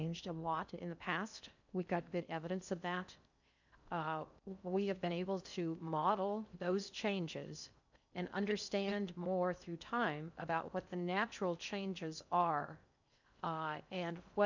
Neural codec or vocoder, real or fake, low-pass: codec, 16 kHz in and 24 kHz out, 0.6 kbps, FocalCodec, streaming, 2048 codes; fake; 7.2 kHz